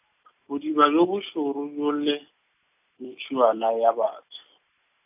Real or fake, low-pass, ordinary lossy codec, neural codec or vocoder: real; 3.6 kHz; AAC, 32 kbps; none